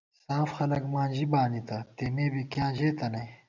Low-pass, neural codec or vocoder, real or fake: 7.2 kHz; none; real